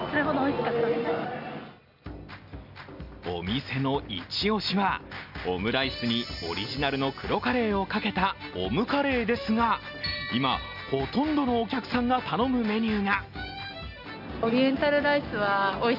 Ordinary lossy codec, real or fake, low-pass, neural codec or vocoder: none; real; 5.4 kHz; none